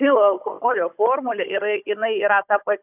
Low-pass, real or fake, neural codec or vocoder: 3.6 kHz; fake; codec, 16 kHz, 16 kbps, FunCodec, trained on Chinese and English, 50 frames a second